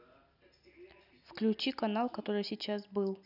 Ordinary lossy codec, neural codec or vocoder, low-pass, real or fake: none; none; 5.4 kHz; real